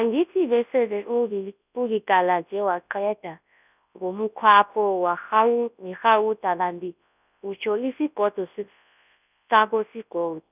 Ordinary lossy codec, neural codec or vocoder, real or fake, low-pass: none; codec, 24 kHz, 0.9 kbps, WavTokenizer, large speech release; fake; 3.6 kHz